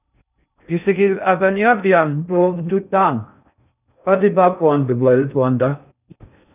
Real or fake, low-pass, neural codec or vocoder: fake; 3.6 kHz; codec, 16 kHz in and 24 kHz out, 0.6 kbps, FocalCodec, streaming, 2048 codes